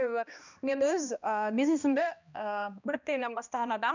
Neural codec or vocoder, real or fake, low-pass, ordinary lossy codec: codec, 16 kHz, 1 kbps, X-Codec, HuBERT features, trained on balanced general audio; fake; 7.2 kHz; none